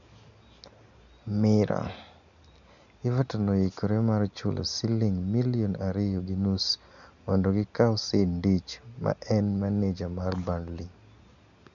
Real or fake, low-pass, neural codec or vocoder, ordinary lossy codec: real; 7.2 kHz; none; none